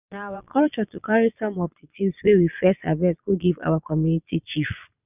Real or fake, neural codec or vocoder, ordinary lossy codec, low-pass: fake; vocoder, 22.05 kHz, 80 mel bands, Vocos; none; 3.6 kHz